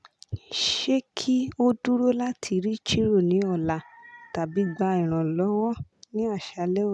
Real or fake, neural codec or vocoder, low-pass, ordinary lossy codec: real; none; none; none